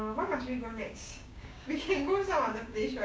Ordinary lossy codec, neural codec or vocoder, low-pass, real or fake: none; codec, 16 kHz, 6 kbps, DAC; none; fake